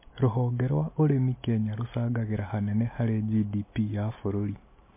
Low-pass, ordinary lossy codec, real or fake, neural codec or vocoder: 3.6 kHz; MP3, 24 kbps; real; none